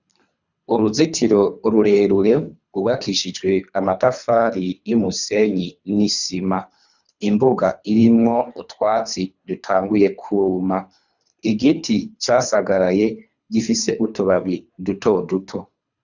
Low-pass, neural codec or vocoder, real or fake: 7.2 kHz; codec, 24 kHz, 3 kbps, HILCodec; fake